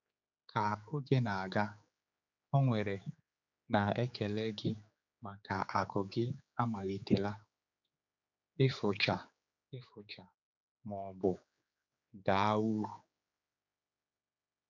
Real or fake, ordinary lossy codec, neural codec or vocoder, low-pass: fake; none; codec, 16 kHz, 4 kbps, X-Codec, HuBERT features, trained on general audio; 7.2 kHz